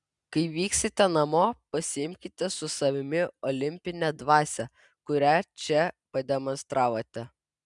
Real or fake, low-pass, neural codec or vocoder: real; 10.8 kHz; none